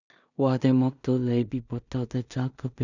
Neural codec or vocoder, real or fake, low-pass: codec, 16 kHz in and 24 kHz out, 0.4 kbps, LongCat-Audio-Codec, two codebook decoder; fake; 7.2 kHz